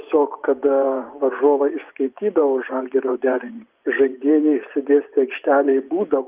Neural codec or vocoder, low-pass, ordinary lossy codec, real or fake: vocoder, 24 kHz, 100 mel bands, Vocos; 3.6 kHz; Opus, 32 kbps; fake